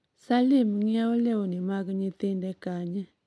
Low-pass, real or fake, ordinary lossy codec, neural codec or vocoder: none; real; none; none